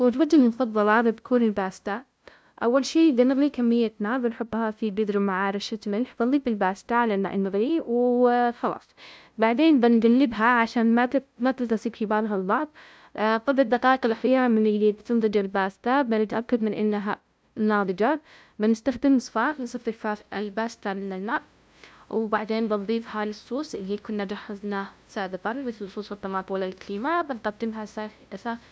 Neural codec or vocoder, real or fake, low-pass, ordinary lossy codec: codec, 16 kHz, 0.5 kbps, FunCodec, trained on LibriTTS, 25 frames a second; fake; none; none